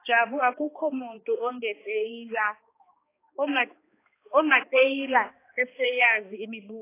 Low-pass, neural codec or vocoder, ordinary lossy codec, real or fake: 3.6 kHz; codec, 16 kHz, 2 kbps, X-Codec, HuBERT features, trained on balanced general audio; AAC, 24 kbps; fake